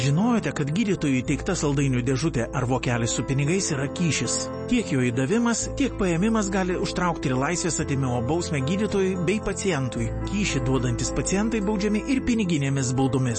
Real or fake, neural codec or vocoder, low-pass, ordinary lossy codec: real; none; 10.8 kHz; MP3, 32 kbps